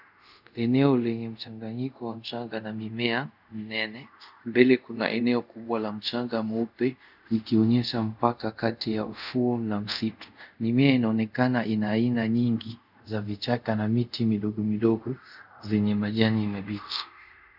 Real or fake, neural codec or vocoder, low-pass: fake; codec, 24 kHz, 0.5 kbps, DualCodec; 5.4 kHz